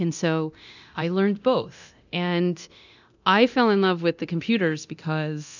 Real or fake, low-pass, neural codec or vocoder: fake; 7.2 kHz; codec, 24 kHz, 0.9 kbps, DualCodec